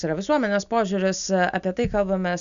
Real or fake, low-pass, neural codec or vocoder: real; 7.2 kHz; none